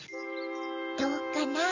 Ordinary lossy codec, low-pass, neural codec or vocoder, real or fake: none; 7.2 kHz; none; real